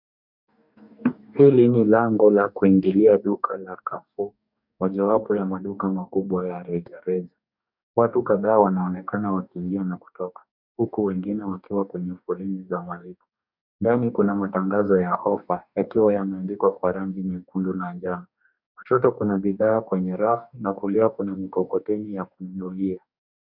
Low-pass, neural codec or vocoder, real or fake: 5.4 kHz; codec, 44.1 kHz, 2.6 kbps, DAC; fake